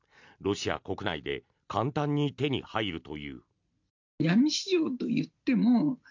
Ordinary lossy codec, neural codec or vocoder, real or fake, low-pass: MP3, 64 kbps; none; real; 7.2 kHz